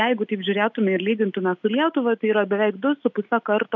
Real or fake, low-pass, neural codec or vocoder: real; 7.2 kHz; none